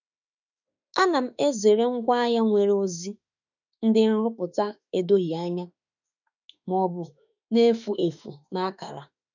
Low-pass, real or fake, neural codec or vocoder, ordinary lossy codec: 7.2 kHz; fake; autoencoder, 48 kHz, 32 numbers a frame, DAC-VAE, trained on Japanese speech; none